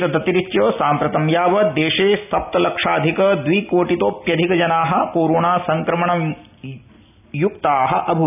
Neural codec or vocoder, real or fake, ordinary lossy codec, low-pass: none; real; none; 3.6 kHz